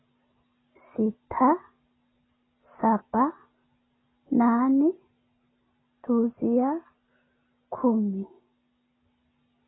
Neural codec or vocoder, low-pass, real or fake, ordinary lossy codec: none; 7.2 kHz; real; AAC, 16 kbps